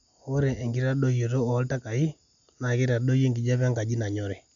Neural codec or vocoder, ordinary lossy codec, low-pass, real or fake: none; none; 7.2 kHz; real